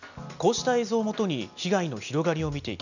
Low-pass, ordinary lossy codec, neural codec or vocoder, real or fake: 7.2 kHz; none; none; real